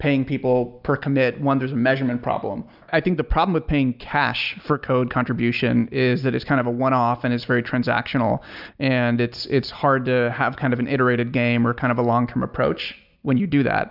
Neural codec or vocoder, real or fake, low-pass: none; real; 5.4 kHz